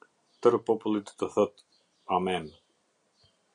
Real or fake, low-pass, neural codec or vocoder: real; 9.9 kHz; none